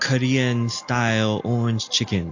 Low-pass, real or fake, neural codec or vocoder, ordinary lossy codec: 7.2 kHz; real; none; AAC, 48 kbps